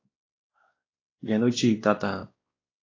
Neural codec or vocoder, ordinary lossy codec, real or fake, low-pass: codec, 16 kHz, 1 kbps, X-Codec, WavLM features, trained on Multilingual LibriSpeech; AAC, 32 kbps; fake; 7.2 kHz